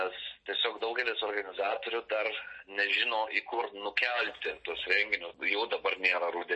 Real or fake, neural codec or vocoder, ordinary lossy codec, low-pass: real; none; MP3, 32 kbps; 10.8 kHz